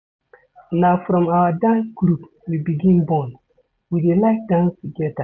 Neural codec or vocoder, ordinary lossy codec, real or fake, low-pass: none; none; real; none